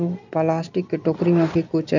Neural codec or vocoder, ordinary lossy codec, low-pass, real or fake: none; none; 7.2 kHz; real